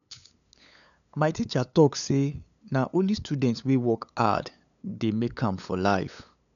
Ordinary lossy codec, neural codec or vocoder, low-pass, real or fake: none; codec, 16 kHz, 8 kbps, FunCodec, trained on LibriTTS, 25 frames a second; 7.2 kHz; fake